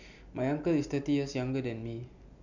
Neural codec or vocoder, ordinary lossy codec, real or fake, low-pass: none; none; real; 7.2 kHz